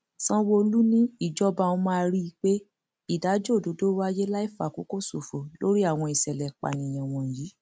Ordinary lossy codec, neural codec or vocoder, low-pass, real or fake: none; none; none; real